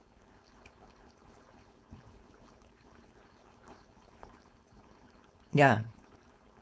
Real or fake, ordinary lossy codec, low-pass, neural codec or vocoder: fake; none; none; codec, 16 kHz, 4.8 kbps, FACodec